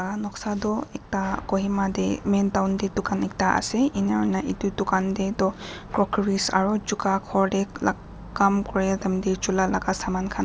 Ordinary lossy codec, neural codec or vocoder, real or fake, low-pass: none; none; real; none